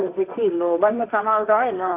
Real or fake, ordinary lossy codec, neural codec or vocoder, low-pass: fake; none; codec, 16 kHz, 1.1 kbps, Voila-Tokenizer; 3.6 kHz